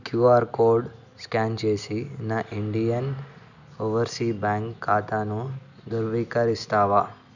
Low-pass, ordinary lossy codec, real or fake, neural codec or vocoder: 7.2 kHz; none; real; none